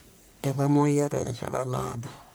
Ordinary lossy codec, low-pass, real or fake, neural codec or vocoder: none; none; fake; codec, 44.1 kHz, 1.7 kbps, Pupu-Codec